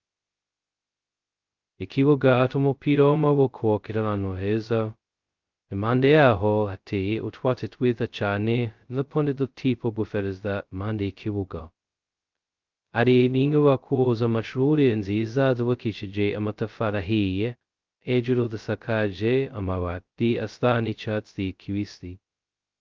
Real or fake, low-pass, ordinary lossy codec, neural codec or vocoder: fake; 7.2 kHz; Opus, 32 kbps; codec, 16 kHz, 0.2 kbps, FocalCodec